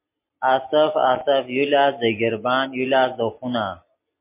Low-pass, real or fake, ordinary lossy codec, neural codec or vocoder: 3.6 kHz; real; MP3, 24 kbps; none